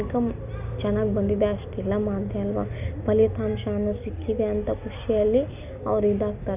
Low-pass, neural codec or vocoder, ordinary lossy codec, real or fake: 3.6 kHz; none; none; real